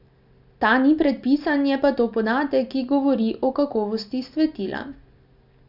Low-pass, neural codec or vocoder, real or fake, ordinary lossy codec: 5.4 kHz; none; real; none